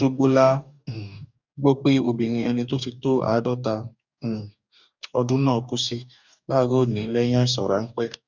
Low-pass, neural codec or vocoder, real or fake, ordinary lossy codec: 7.2 kHz; codec, 44.1 kHz, 2.6 kbps, DAC; fake; none